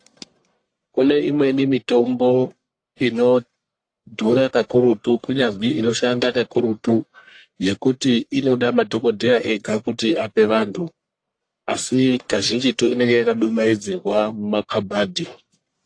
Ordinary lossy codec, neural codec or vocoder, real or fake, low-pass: AAC, 48 kbps; codec, 44.1 kHz, 1.7 kbps, Pupu-Codec; fake; 9.9 kHz